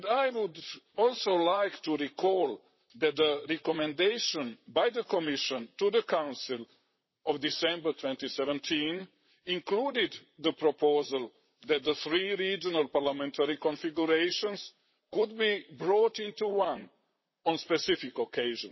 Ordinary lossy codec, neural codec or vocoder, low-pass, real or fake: MP3, 24 kbps; vocoder, 44.1 kHz, 128 mel bands, Pupu-Vocoder; 7.2 kHz; fake